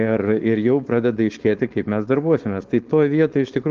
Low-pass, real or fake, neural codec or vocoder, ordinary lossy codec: 7.2 kHz; fake; codec, 16 kHz, 4.8 kbps, FACodec; Opus, 16 kbps